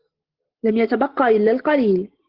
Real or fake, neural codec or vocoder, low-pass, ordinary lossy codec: real; none; 5.4 kHz; Opus, 16 kbps